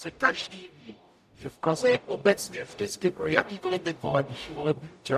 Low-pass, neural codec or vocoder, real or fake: 14.4 kHz; codec, 44.1 kHz, 0.9 kbps, DAC; fake